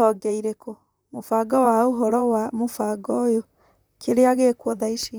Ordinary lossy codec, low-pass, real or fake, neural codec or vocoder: none; none; fake; vocoder, 44.1 kHz, 128 mel bands, Pupu-Vocoder